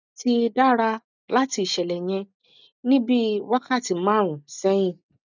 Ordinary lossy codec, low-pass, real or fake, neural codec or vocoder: none; 7.2 kHz; real; none